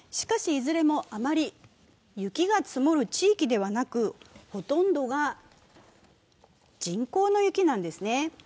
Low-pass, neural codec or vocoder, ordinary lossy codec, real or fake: none; none; none; real